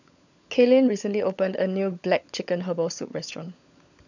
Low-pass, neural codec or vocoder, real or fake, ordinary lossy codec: 7.2 kHz; codec, 16 kHz, 16 kbps, FunCodec, trained on LibriTTS, 50 frames a second; fake; none